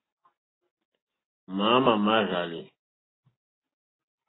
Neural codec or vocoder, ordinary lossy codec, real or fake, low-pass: none; AAC, 16 kbps; real; 7.2 kHz